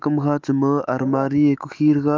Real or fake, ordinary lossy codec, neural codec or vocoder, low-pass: real; Opus, 24 kbps; none; 7.2 kHz